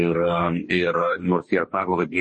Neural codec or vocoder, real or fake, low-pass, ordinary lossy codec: codec, 44.1 kHz, 2.6 kbps, DAC; fake; 10.8 kHz; MP3, 32 kbps